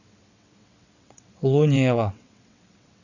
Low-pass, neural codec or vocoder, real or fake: 7.2 kHz; vocoder, 22.05 kHz, 80 mel bands, WaveNeXt; fake